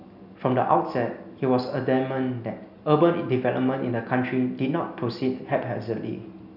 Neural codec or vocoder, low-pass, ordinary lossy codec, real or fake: none; 5.4 kHz; none; real